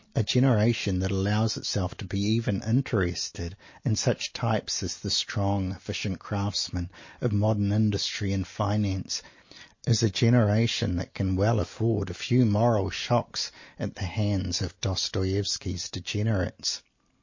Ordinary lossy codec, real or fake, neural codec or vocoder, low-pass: MP3, 32 kbps; real; none; 7.2 kHz